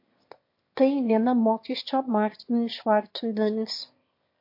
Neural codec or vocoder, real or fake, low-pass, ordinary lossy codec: autoencoder, 22.05 kHz, a latent of 192 numbers a frame, VITS, trained on one speaker; fake; 5.4 kHz; MP3, 32 kbps